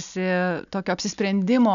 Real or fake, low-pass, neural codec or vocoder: real; 7.2 kHz; none